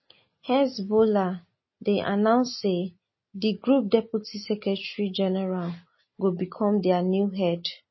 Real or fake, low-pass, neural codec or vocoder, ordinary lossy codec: real; 7.2 kHz; none; MP3, 24 kbps